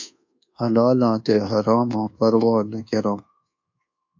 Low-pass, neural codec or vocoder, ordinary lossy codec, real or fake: 7.2 kHz; codec, 24 kHz, 1.2 kbps, DualCodec; AAC, 48 kbps; fake